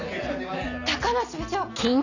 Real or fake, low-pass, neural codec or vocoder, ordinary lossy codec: real; 7.2 kHz; none; none